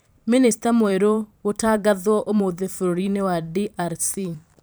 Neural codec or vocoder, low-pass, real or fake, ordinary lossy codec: vocoder, 44.1 kHz, 128 mel bands every 512 samples, BigVGAN v2; none; fake; none